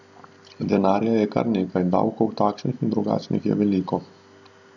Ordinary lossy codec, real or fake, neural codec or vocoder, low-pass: none; real; none; 7.2 kHz